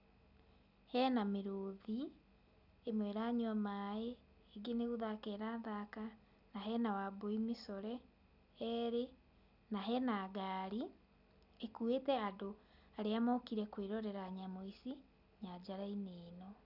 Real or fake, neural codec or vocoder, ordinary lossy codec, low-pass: real; none; none; 5.4 kHz